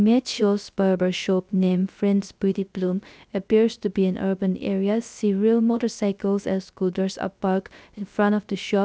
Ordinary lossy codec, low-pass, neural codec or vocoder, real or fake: none; none; codec, 16 kHz, 0.3 kbps, FocalCodec; fake